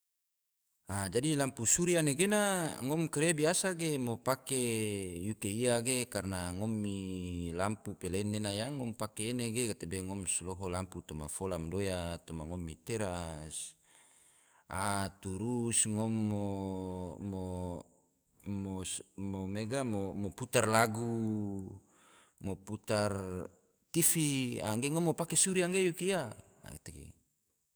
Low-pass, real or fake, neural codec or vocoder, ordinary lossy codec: none; fake; codec, 44.1 kHz, 7.8 kbps, DAC; none